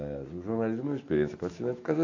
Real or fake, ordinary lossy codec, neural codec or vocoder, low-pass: real; AAC, 48 kbps; none; 7.2 kHz